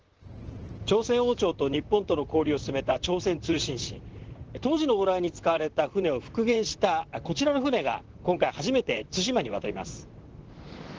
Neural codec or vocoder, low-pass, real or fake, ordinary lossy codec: vocoder, 44.1 kHz, 128 mel bands, Pupu-Vocoder; 7.2 kHz; fake; Opus, 16 kbps